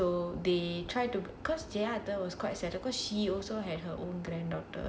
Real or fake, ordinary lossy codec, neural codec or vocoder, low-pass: real; none; none; none